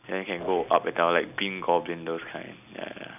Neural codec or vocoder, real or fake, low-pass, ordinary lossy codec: none; real; 3.6 kHz; none